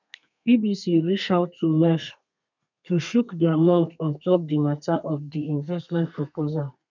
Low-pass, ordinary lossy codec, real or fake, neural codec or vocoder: 7.2 kHz; none; fake; codec, 32 kHz, 1.9 kbps, SNAC